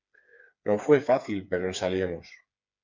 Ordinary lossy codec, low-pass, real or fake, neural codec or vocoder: MP3, 48 kbps; 7.2 kHz; fake; codec, 16 kHz, 4 kbps, FreqCodec, smaller model